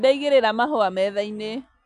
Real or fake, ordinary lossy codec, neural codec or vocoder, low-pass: real; none; none; 9.9 kHz